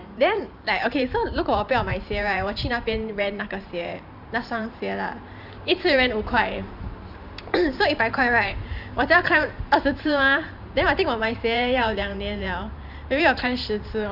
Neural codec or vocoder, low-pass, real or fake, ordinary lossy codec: vocoder, 44.1 kHz, 128 mel bands every 512 samples, BigVGAN v2; 5.4 kHz; fake; none